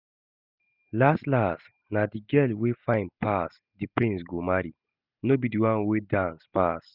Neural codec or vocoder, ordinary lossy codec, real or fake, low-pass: none; none; real; 5.4 kHz